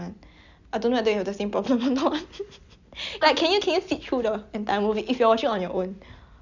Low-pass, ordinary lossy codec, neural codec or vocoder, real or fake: 7.2 kHz; none; none; real